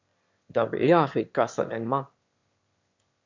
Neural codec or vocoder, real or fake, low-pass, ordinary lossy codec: autoencoder, 22.05 kHz, a latent of 192 numbers a frame, VITS, trained on one speaker; fake; 7.2 kHz; MP3, 48 kbps